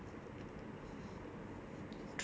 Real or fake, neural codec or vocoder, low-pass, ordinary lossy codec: real; none; none; none